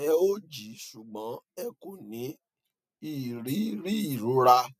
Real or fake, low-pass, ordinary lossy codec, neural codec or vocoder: real; 14.4 kHz; none; none